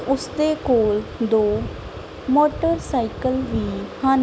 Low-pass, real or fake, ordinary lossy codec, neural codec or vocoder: none; real; none; none